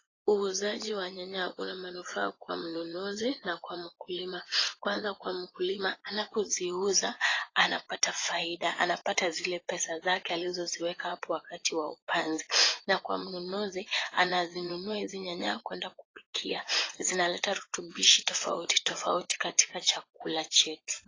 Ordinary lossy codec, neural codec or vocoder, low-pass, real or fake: AAC, 32 kbps; vocoder, 24 kHz, 100 mel bands, Vocos; 7.2 kHz; fake